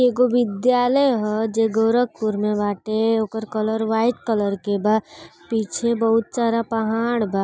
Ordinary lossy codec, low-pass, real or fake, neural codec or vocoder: none; none; real; none